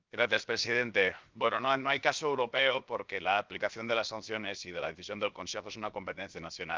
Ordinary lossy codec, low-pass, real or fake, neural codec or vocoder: Opus, 24 kbps; 7.2 kHz; fake; codec, 16 kHz, 0.7 kbps, FocalCodec